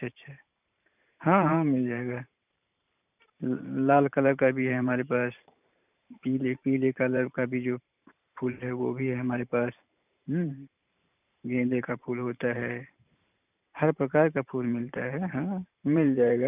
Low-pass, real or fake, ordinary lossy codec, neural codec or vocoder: 3.6 kHz; fake; none; vocoder, 44.1 kHz, 128 mel bands every 512 samples, BigVGAN v2